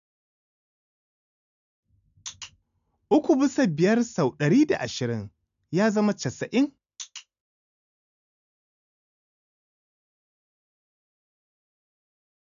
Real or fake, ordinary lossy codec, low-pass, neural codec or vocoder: real; MP3, 96 kbps; 7.2 kHz; none